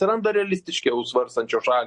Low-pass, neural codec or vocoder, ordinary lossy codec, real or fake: 10.8 kHz; none; MP3, 48 kbps; real